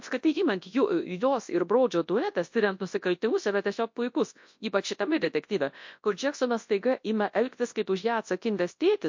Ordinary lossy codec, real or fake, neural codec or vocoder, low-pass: MP3, 48 kbps; fake; codec, 24 kHz, 0.9 kbps, WavTokenizer, large speech release; 7.2 kHz